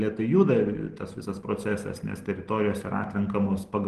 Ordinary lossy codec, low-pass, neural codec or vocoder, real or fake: Opus, 16 kbps; 10.8 kHz; none; real